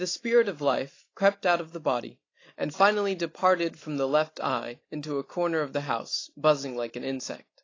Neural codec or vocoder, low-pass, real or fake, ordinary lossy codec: none; 7.2 kHz; real; AAC, 32 kbps